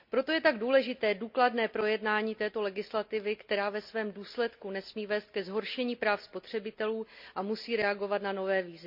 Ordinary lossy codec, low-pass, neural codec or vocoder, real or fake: MP3, 48 kbps; 5.4 kHz; none; real